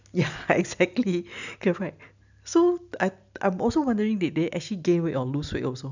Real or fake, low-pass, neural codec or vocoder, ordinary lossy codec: real; 7.2 kHz; none; none